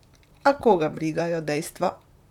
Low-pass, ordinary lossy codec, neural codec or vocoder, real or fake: 19.8 kHz; none; vocoder, 44.1 kHz, 128 mel bands, Pupu-Vocoder; fake